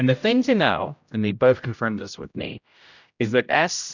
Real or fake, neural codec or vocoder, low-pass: fake; codec, 16 kHz, 0.5 kbps, X-Codec, HuBERT features, trained on general audio; 7.2 kHz